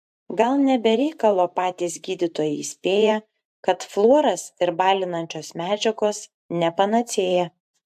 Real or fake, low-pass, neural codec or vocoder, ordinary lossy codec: fake; 14.4 kHz; vocoder, 44.1 kHz, 128 mel bands every 512 samples, BigVGAN v2; AAC, 96 kbps